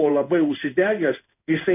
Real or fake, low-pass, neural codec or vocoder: fake; 3.6 kHz; codec, 16 kHz in and 24 kHz out, 1 kbps, XY-Tokenizer